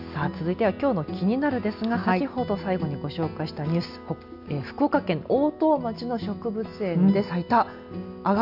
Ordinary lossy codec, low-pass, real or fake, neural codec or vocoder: none; 5.4 kHz; real; none